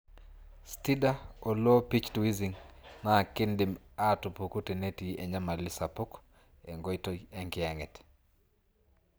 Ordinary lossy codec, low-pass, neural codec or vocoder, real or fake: none; none; none; real